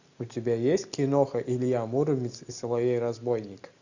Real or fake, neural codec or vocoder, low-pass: real; none; 7.2 kHz